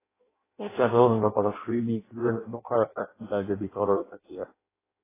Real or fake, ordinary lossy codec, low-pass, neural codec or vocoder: fake; AAC, 16 kbps; 3.6 kHz; codec, 16 kHz in and 24 kHz out, 0.6 kbps, FireRedTTS-2 codec